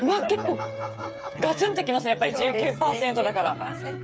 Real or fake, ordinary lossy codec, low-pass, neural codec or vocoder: fake; none; none; codec, 16 kHz, 8 kbps, FreqCodec, smaller model